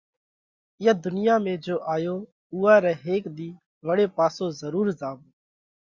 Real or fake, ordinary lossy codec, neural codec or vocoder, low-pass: real; Opus, 64 kbps; none; 7.2 kHz